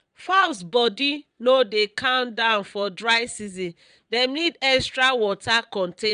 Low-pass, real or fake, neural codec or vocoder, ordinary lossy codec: 9.9 kHz; fake; vocoder, 22.05 kHz, 80 mel bands, Vocos; none